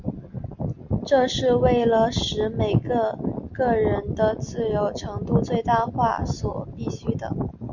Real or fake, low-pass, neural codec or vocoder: real; 7.2 kHz; none